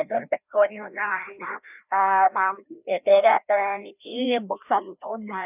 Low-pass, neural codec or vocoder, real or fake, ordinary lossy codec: 3.6 kHz; codec, 16 kHz, 1 kbps, FreqCodec, larger model; fake; none